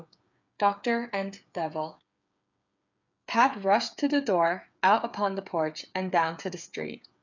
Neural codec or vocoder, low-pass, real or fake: codec, 16 kHz, 8 kbps, FreqCodec, smaller model; 7.2 kHz; fake